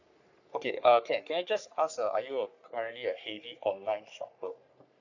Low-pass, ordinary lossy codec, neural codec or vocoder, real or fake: 7.2 kHz; none; codec, 44.1 kHz, 3.4 kbps, Pupu-Codec; fake